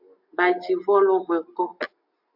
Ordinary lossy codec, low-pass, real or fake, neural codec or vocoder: MP3, 48 kbps; 5.4 kHz; real; none